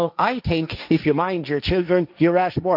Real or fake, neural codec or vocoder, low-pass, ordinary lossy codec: fake; codec, 16 kHz, 1.1 kbps, Voila-Tokenizer; 5.4 kHz; none